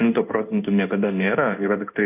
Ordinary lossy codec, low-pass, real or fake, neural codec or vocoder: AAC, 32 kbps; 3.6 kHz; fake; codec, 16 kHz in and 24 kHz out, 1 kbps, XY-Tokenizer